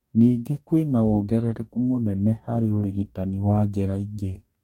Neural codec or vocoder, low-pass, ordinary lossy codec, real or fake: codec, 44.1 kHz, 2.6 kbps, DAC; 19.8 kHz; MP3, 64 kbps; fake